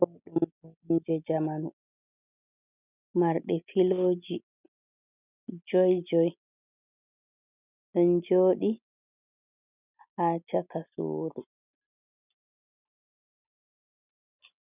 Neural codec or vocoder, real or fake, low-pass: none; real; 3.6 kHz